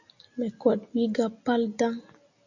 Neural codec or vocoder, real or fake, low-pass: none; real; 7.2 kHz